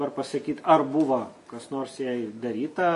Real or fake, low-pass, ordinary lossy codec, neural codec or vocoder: real; 14.4 kHz; MP3, 48 kbps; none